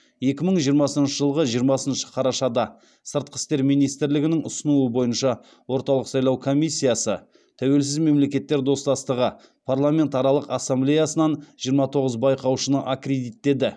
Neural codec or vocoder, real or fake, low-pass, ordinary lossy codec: none; real; none; none